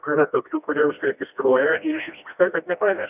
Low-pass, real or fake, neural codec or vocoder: 3.6 kHz; fake; codec, 16 kHz, 1 kbps, FreqCodec, smaller model